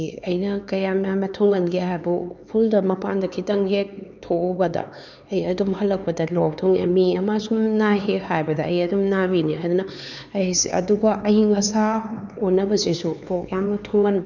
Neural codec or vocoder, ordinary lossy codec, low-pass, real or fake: codec, 16 kHz, 4 kbps, X-Codec, HuBERT features, trained on LibriSpeech; none; 7.2 kHz; fake